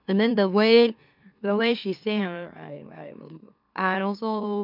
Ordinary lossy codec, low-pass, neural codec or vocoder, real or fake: none; 5.4 kHz; autoencoder, 44.1 kHz, a latent of 192 numbers a frame, MeloTTS; fake